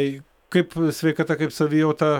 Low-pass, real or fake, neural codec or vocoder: 19.8 kHz; fake; autoencoder, 48 kHz, 128 numbers a frame, DAC-VAE, trained on Japanese speech